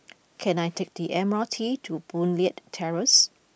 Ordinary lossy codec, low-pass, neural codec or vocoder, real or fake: none; none; none; real